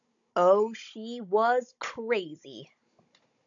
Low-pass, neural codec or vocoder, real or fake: 7.2 kHz; codec, 16 kHz, 16 kbps, FunCodec, trained on Chinese and English, 50 frames a second; fake